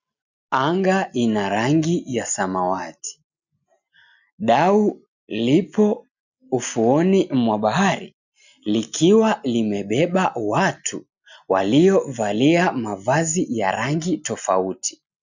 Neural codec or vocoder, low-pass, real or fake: none; 7.2 kHz; real